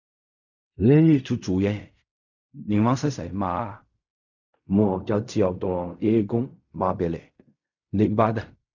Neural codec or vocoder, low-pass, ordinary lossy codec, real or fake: codec, 16 kHz in and 24 kHz out, 0.4 kbps, LongCat-Audio-Codec, fine tuned four codebook decoder; 7.2 kHz; none; fake